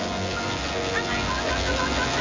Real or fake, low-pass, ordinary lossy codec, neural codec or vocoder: fake; 7.2 kHz; MP3, 48 kbps; vocoder, 24 kHz, 100 mel bands, Vocos